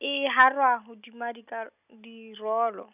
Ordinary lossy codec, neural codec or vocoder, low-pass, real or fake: none; none; 3.6 kHz; real